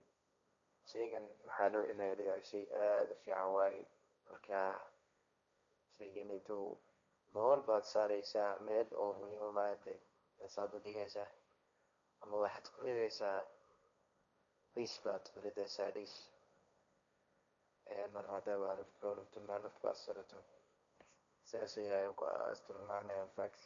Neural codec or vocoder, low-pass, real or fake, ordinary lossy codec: codec, 16 kHz, 1.1 kbps, Voila-Tokenizer; 7.2 kHz; fake; MP3, 64 kbps